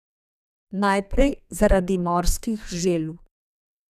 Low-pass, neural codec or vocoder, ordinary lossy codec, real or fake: 14.4 kHz; codec, 32 kHz, 1.9 kbps, SNAC; none; fake